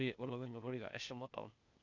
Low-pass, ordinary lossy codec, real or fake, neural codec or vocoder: 7.2 kHz; none; fake; codec, 16 kHz, 0.8 kbps, ZipCodec